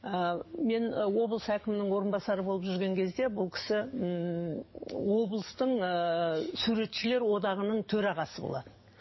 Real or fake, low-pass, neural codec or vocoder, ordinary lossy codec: fake; 7.2 kHz; codec, 44.1 kHz, 7.8 kbps, DAC; MP3, 24 kbps